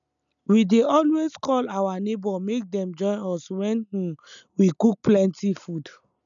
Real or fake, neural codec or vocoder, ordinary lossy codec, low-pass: real; none; none; 7.2 kHz